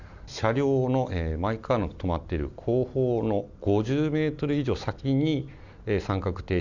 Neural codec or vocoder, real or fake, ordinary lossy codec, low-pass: none; real; Opus, 64 kbps; 7.2 kHz